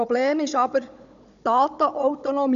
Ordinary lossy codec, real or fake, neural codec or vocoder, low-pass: none; fake; codec, 16 kHz, 16 kbps, FunCodec, trained on Chinese and English, 50 frames a second; 7.2 kHz